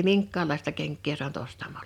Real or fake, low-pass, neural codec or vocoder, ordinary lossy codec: real; 19.8 kHz; none; none